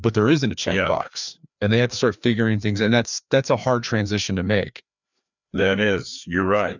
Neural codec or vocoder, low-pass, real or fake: codec, 16 kHz, 2 kbps, FreqCodec, larger model; 7.2 kHz; fake